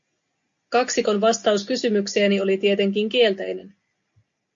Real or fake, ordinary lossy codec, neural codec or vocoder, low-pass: real; MP3, 48 kbps; none; 7.2 kHz